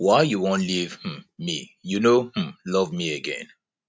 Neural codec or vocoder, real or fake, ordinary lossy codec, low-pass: none; real; none; none